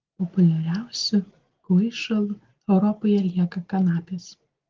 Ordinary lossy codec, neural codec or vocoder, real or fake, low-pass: Opus, 16 kbps; none; real; 7.2 kHz